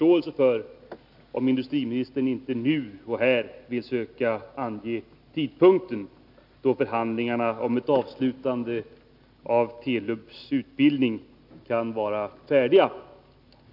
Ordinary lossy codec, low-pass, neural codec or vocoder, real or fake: none; 5.4 kHz; none; real